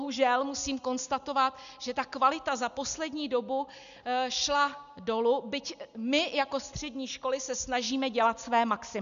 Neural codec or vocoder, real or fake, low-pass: none; real; 7.2 kHz